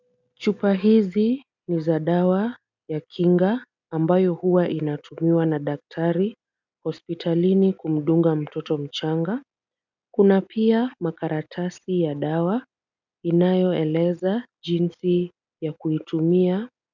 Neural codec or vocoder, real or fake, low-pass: none; real; 7.2 kHz